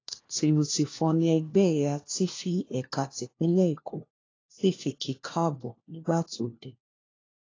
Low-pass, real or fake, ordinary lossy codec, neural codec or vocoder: 7.2 kHz; fake; AAC, 32 kbps; codec, 16 kHz, 1 kbps, FunCodec, trained on LibriTTS, 50 frames a second